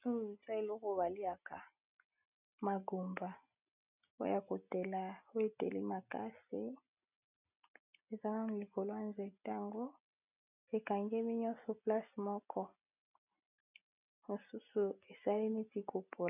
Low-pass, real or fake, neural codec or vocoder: 3.6 kHz; real; none